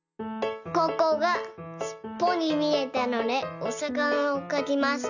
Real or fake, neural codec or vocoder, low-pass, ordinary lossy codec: real; none; 7.2 kHz; none